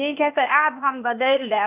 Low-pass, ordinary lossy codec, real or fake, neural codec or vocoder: 3.6 kHz; none; fake; codec, 16 kHz, 0.8 kbps, ZipCodec